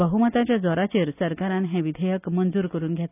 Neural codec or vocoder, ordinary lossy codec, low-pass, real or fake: none; none; 3.6 kHz; real